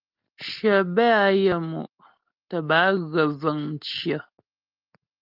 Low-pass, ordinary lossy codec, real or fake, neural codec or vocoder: 5.4 kHz; Opus, 24 kbps; real; none